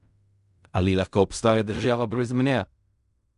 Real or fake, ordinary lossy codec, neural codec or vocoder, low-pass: fake; none; codec, 16 kHz in and 24 kHz out, 0.4 kbps, LongCat-Audio-Codec, fine tuned four codebook decoder; 10.8 kHz